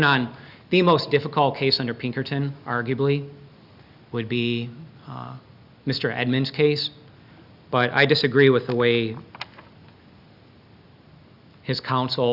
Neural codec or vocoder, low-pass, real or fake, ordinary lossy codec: none; 5.4 kHz; real; Opus, 64 kbps